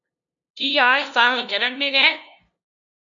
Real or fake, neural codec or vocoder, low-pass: fake; codec, 16 kHz, 0.5 kbps, FunCodec, trained on LibriTTS, 25 frames a second; 7.2 kHz